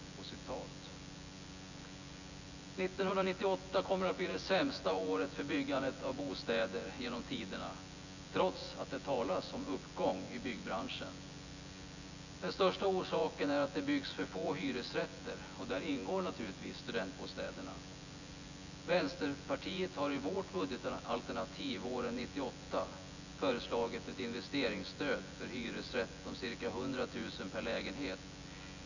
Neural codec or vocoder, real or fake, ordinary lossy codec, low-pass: vocoder, 24 kHz, 100 mel bands, Vocos; fake; none; 7.2 kHz